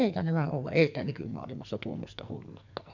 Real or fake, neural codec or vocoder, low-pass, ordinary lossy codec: fake; codec, 44.1 kHz, 2.6 kbps, SNAC; 7.2 kHz; none